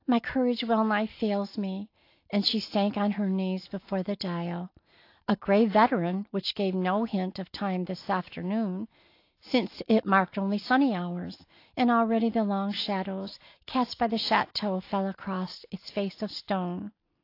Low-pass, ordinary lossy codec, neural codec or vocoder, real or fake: 5.4 kHz; AAC, 32 kbps; none; real